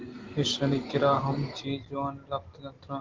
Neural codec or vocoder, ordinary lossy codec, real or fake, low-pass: none; Opus, 16 kbps; real; 7.2 kHz